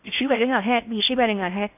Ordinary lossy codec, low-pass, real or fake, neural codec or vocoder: none; 3.6 kHz; fake; codec, 16 kHz in and 24 kHz out, 0.6 kbps, FocalCodec, streaming, 2048 codes